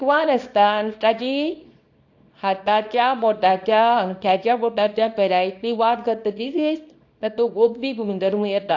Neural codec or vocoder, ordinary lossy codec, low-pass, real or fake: codec, 24 kHz, 0.9 kbps, WavTokenizer, small release; AAC, 48 kbps; 7.2 kHz; fake